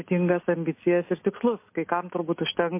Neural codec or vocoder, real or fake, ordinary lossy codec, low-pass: none; real; MP3, 24 kbps; 3.6 kHz